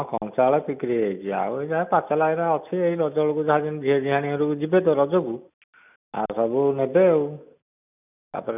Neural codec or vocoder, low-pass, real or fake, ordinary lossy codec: none; 3.6 kHz; real; none